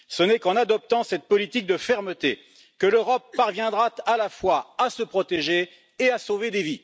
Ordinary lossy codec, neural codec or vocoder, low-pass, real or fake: none; none; none; real